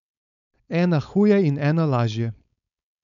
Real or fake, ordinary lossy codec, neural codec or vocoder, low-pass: fake; none; codec, 16 kHz, 4.8 kbps, FACodec; 7.2 kHz